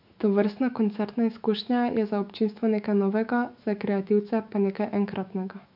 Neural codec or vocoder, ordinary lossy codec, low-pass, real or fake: autoencoder, 48 kHz, 128 numbers a frame, DAC-VAE, trained on Japanese speech; AAC, 48 kbps; 5.4 kHz; fake